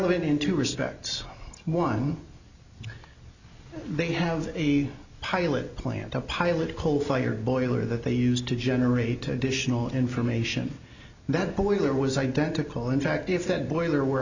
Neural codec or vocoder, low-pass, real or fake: none; 7.2 kHz; real